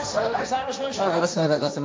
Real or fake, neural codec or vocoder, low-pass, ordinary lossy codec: fake; codec, 16 kHz, 1.1 kbps, Voila-Tokenizer; none; none